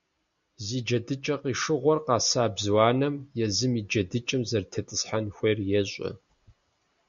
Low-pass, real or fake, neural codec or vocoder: 7.2 kHz; real; none